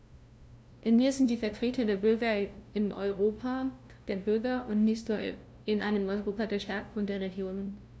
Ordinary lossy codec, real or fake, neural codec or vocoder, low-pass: none; fake; codec, 16 kHz, 0.5 kbps, FunCodec, trained on LibriTTS, 25 frames a second; none